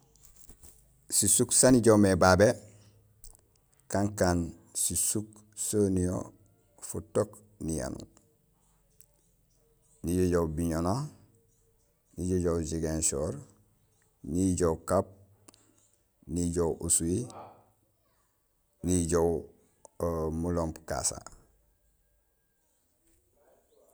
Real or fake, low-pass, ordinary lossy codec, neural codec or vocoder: real; none; none; none